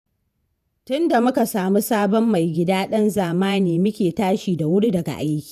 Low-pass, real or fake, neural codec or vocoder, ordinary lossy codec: 14.4 kHz; fake; vocoder, 48 kHz, 128 mel bands, Vocos; none